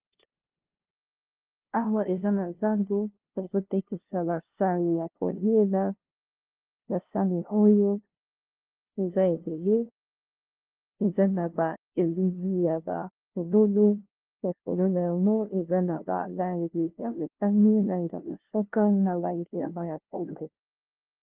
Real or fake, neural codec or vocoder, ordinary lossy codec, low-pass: fake; codec, 16 kHz, 0.5 kbps, FunCodec, trained on LibriTTS, 25 frames a second; Opus, 16 kbps; 3.6 kHz